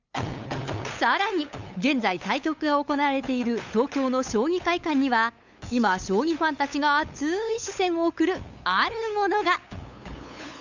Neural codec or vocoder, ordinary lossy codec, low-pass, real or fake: codec, 16 kHz, 8 kbps, FunCodec, trained on LibriTTS, 25 frames a second; Opus, 64 kbps; 7.2 kHz; fake